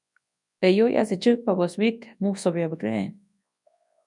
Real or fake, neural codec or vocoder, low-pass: fake; codec, 24 kHz, 0.9 kbps, WavTokenizer, large speech release; 10.8 kHz